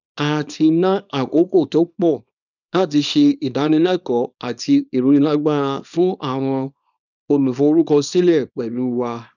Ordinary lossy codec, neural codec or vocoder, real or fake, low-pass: none; codec, 24 kHz, 0.9 kbps, WavTokenizer, small release; fake; 7.2 kHz